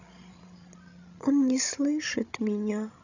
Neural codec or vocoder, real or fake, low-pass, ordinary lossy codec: codec, 16 kHz, 16 kbps, FreqCodec, larger model; fake; 7.2 kHz; none